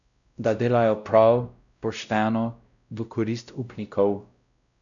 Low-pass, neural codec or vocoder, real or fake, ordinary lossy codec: 7.2 kHz; codec, 16 kHz, 0.5 kbps, X-Codec, WavLM features, trained on Multilingual LibriSpeech; fake; none